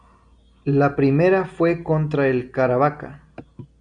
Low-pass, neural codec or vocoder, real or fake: 9.9 kHz; none; real